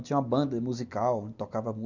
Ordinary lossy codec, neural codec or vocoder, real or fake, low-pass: none; none; real; 7.2 kHz